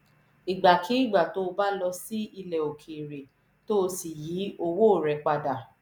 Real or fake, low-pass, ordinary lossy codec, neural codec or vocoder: real; none; none; none